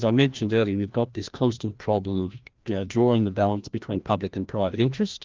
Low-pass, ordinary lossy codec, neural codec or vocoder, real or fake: 7.2 kHz; Opus, 32 kbps; codec, 16 kHz, 1 kbps, FreqCodec, larger model; fake